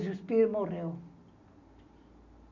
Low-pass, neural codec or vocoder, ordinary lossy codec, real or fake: 7.2 kHz; none; none; real